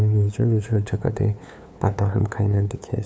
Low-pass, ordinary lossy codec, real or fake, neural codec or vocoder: none; none; fake; codec, 16 kHz, 2 kbps, FunCodec, trained on LibriTTS, 25 frames a second